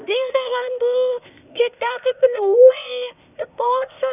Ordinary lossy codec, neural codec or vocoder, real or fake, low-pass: none; codec, 16 kHz, 0.8 kbps, ZipCodec; fake; 3.6 kHz